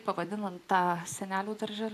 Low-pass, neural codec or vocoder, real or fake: 14.4 kHz; none; real